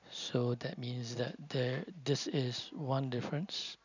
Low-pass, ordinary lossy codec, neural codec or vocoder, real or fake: 7.2 kHz; none; none; real